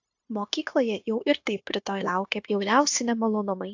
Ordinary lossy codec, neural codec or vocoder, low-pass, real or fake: AAC, 48 kbps; codec, 16 kHz, 0.9 kbps, LongCat-Audio-Codec; 7.2 kHz; fake